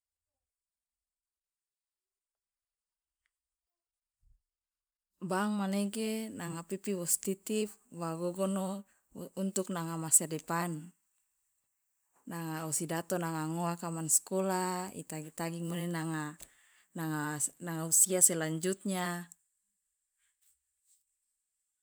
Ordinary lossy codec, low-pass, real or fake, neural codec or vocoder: none; none; fake; vocoder, 44.1 kHz, 128 mel bands every 512 samples, BigVGAN v2